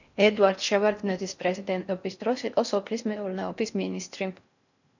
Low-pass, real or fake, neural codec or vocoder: 7.2 kHz; fake; codec, 16 kHz in and 24 kHz out, 0.6 kbps, FocalCodec, streaming, 4096 codes